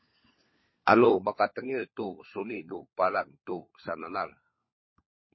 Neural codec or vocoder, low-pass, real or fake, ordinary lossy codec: codec, 16 kHz, 4 kbps, FunCodec, trained on LibriTTS, 50 frames a second; 7.2 kHz; fake; MP3, 24 kbps